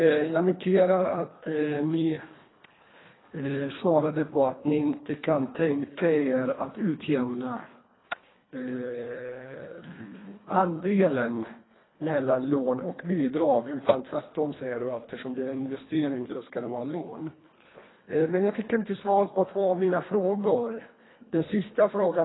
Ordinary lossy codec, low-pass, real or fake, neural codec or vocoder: AAC, 16 kbps; 7.2 kHz; fake; codec, 24 kHz, 1.5 kbps, HILCodec